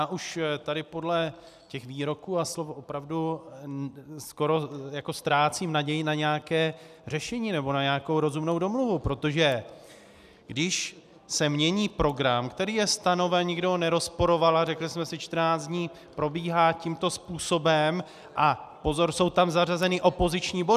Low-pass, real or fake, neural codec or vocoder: 14.4 kHz; real; none